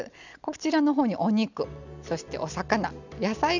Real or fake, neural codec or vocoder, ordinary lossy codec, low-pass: real; none; none; 7.2 kHz